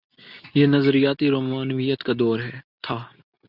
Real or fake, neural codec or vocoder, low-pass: real; none; 5.4 kHz